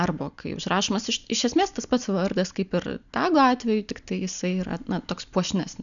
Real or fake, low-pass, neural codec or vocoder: real; 7.2 kHz; none